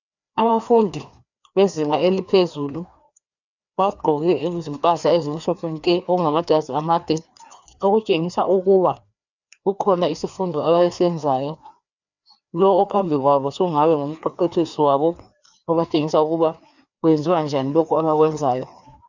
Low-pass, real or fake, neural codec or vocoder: 7.2 kHz; fake; codec, 16 kHz, 2 kbps, FreqCodec, larger model